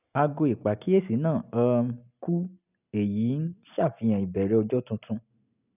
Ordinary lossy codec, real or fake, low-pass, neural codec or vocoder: none; real; 3.6 kHz; none